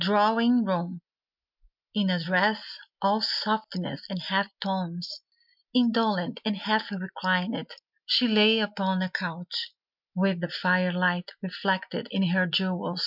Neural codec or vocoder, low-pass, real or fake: none; 5.4 kHz; real